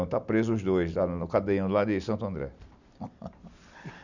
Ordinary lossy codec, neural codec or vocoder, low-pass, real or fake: none; none; 7.2 kHz; real